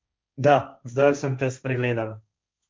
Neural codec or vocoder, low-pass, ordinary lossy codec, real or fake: codec, 16 kHz, 1.1 kbps, Voila-Tokenizer; none; none; fake